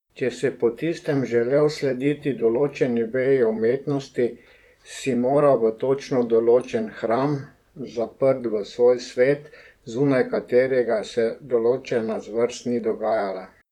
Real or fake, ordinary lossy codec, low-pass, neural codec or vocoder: fake; none; 19.8 kHz; vocoder, 44.1 kHz, 128 mel bands, Pupu-Vocoder